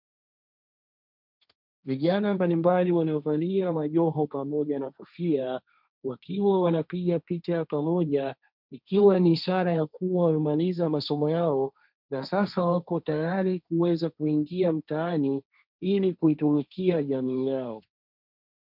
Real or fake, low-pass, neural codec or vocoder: fake; 5.4 kHz; codec, 16 kHz, 1.1 kbps, Voila-Tokenizer